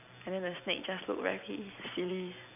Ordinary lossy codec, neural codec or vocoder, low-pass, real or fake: none; none; 3.6 kHz; real